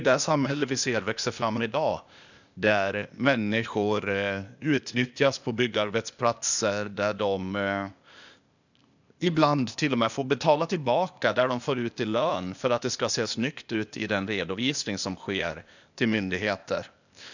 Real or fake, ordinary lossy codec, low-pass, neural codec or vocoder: fake; none; 7.2 kHz; codec, 16 kHz, 0.8 kbps, ZipCodec